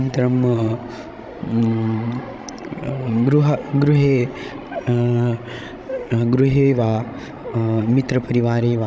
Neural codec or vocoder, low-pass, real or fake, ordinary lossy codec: codec, 16 kHz, 16 kbps, FreqCodec, larger model; none; fake; none